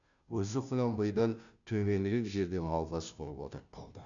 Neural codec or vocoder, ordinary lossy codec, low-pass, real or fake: codec, 16 kHz, 0.5 kbps, FunCodec, trained on Chinese and English, 25 frames a second; none; 7.2 kHz; fake